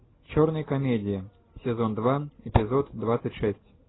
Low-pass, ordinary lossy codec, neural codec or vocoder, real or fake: 7.2 kHz; AAC, 16 kbps; none; real